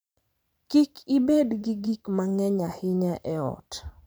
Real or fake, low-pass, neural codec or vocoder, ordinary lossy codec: fake; none; vocoder, 44.1 kHz, 128 mel bands every 512 samples, BigVGAN v2; none